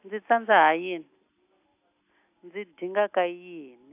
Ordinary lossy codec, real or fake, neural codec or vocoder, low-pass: AAC, 32 kbps; real; none; 3.6 kHz